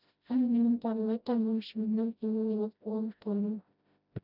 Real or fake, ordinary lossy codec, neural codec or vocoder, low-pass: fake; none; codec, 16 kHz, 0.5 kbps, FreqCodec, smaller model; 5.4 kHz